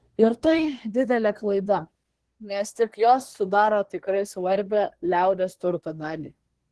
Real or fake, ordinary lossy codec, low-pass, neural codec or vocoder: fake; Opus, 16 kbps; 10.8 kHz; codec, 24 kHz, 1 kbps, SNAC